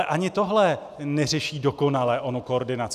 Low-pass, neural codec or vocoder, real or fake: 14.4 kHz; none; real